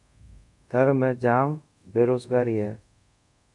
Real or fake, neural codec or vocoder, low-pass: fake; codec, 24 kHz, 0.5 kbps, DualCodec; 10.8 kHz